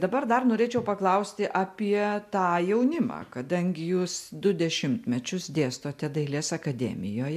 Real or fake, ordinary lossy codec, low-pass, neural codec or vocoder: real; MP3, 96 kbps; 14.4 kHz; none